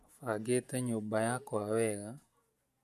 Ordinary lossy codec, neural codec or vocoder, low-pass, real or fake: MP3, 96 kbps; vocoder, 48 kHz, 128 mel bands, Vocos; 14.4 kHz; fake